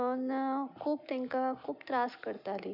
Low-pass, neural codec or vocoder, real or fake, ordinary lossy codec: 5.4 kHz; none; real; none